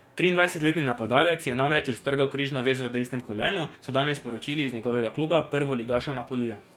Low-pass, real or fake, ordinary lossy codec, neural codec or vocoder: 19.8 kHz; fake; none; codec, 44.1 kHz, 2.6 kbps, DAC